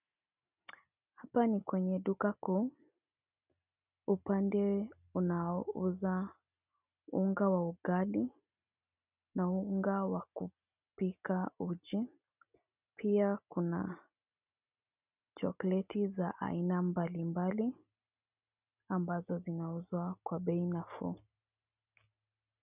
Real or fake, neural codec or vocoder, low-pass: real; none; 3.6 kHz